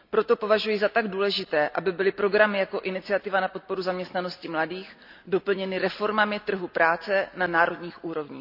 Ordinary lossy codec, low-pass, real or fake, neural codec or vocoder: AAC, 48 kbps; 5.4 kHz; real; none